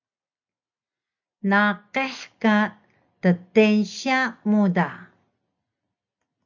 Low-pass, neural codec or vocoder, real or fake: 7.2 kHz; none; real